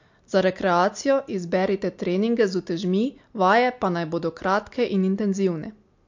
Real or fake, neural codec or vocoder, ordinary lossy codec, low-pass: real; none; MP3, 48 kbps; 7.2 kHz